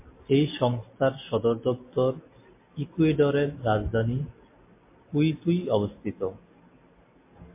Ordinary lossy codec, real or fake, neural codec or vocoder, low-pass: MP3, 24 kbps; real; none; 3.6 kHz